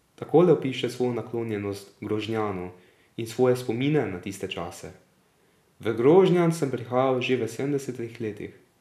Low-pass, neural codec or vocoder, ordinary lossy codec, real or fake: 14.4 kHz; none; none; real